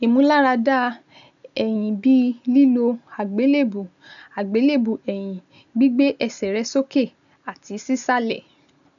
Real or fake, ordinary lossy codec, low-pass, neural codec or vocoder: real; none; 7.2 kHz; none